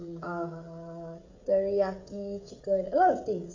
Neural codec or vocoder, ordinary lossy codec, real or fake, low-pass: codec, 16 kHz, 16 kbps, FreqCodec, smaller model; AAC, 48 kbps; fake; 7.2 kHz